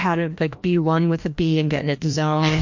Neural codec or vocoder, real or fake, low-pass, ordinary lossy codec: codec, 16 kHz, 1 kbps, FreqCodec, larger model; fake; 7.2 kHz; MP3, 48 kbps